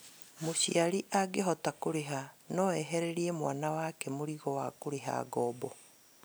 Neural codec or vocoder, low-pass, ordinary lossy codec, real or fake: none; none; none; real